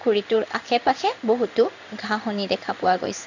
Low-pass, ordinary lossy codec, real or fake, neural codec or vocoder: 7.2 kHz; none; fake; codec, 16 kHz in and 24 kHz out, 1 kbps, XY-Tokenizer